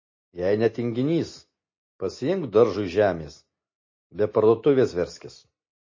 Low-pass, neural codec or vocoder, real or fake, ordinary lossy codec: 7.2 kHz; none; real; MP3, 32 kbps